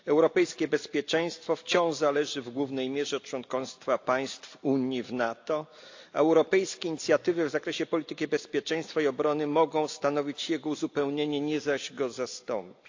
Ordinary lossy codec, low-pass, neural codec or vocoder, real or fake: AAC, 48 kbps; 7.2 kHz; none; real